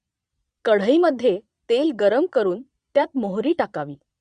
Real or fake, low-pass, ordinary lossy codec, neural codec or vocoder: fake; 9.9 kHz; Opus, 64 kbps; vocoder, 22.05 kHz, 80 mel bands, Vocos